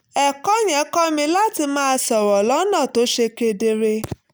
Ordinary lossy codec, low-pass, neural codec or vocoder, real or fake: none; none; none; real